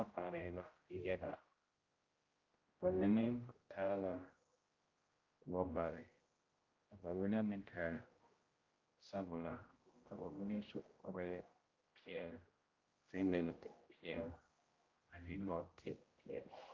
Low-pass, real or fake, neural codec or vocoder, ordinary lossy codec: 7.2 kHz; fake; codec, 16 kHz, 0.5 kbps, X-Codec, HuBERT features, trained on general audio; Opus, 32 kbps